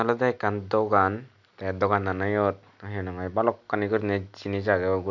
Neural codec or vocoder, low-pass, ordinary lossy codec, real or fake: none; 7.2 kHz; none; real